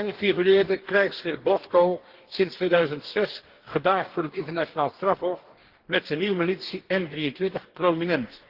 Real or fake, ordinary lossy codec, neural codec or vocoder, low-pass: fake; Opus, 16 kbps; codec, 44.1 kHz, 2.6 kbps, DAC; 5.4 kHz